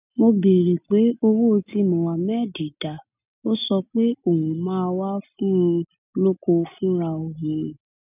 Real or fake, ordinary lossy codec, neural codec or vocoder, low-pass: real; none; none; 3.6 kHz